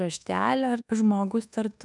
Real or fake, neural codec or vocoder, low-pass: fake; codec, 24 kHz, 1.2 kbps, DualCodec; 10.8 kHz